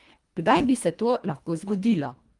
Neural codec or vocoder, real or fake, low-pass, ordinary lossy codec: codec, 24 kHz, 1.5 kbps, HILCodec; fake; 10.8 kHz; Opus, 32 kbps